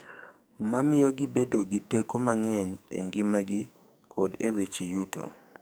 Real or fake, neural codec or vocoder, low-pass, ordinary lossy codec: fake; codec, 44.1 kHz, 2.6 kbps, SNAC; none; none